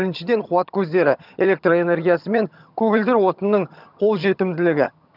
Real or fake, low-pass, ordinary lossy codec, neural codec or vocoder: fake; 5.4 kHz; none; vocoder, 22.05 kHz, 80 mel bands, HiFi-GAN